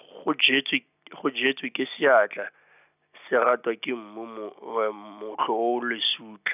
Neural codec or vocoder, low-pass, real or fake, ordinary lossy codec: none; 3.6 kHz; real; none